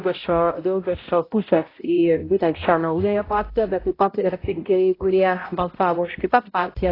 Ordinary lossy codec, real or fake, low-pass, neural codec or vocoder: AAC, 24 kbps; fake; 5.4 kHz; codec, 16 kHz, 0.5 kbps, X-Codec, HuBERT features, trained on balanced general audio